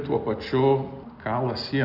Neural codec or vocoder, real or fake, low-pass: none; real; 5.4 kHz